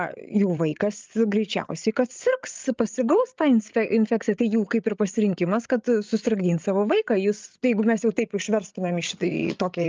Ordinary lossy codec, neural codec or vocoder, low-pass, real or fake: Opus, 24 kbps; codec, 16 kHz, 8 kbps, FreqCodec, larger model; 7.2 kHz; fake